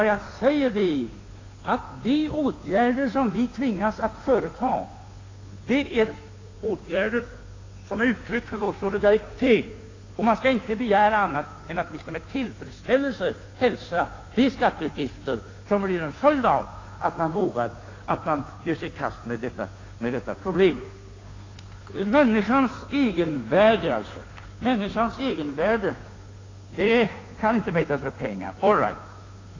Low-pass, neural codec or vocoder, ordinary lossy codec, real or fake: 7.2 kHz; codec, 16 kHz, 2 kbps, FunCodec, trained on Chinese and English, 25 frames a second; AAC, 32 kbps; fake